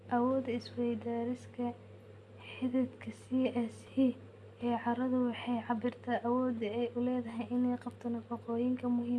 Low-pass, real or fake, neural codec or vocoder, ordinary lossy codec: none; real; none; none